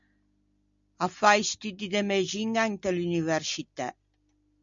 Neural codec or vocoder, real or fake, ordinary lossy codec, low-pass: none; real; MP3, 64 kbps; 7.2 kHz